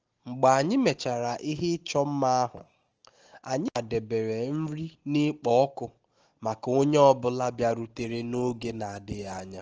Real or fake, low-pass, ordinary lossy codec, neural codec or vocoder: real; 7.2 kHz; Opus, 16 kbps; none